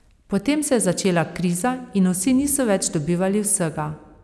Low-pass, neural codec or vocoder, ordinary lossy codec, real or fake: none; none; none; real